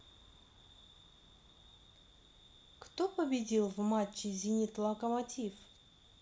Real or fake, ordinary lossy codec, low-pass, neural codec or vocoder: real; none; none; none